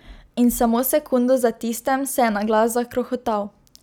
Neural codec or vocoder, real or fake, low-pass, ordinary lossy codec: none; real; none; none